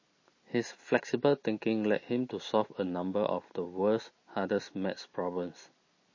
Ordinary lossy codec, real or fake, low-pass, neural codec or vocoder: MP3, 32 kbps; real; 7.2 kHz; none